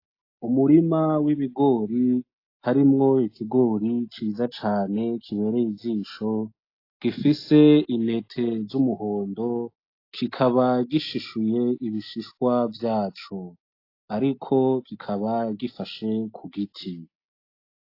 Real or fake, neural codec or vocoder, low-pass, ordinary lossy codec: real; none; 5.4 kHz; AAC, 32 kbps